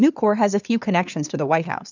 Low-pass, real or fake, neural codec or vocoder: 7.2 kHz; fake; codec, 16 kHz, 2 kbps, FunCodec, trained on LibriTTS, 25 frames a second